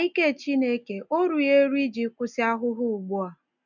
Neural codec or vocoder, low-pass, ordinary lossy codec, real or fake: none; 7.2 kHz; none; real